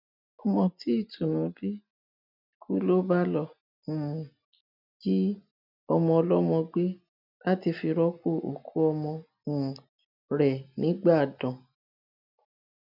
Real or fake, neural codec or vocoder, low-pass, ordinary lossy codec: real; none; 5.4 kHz; none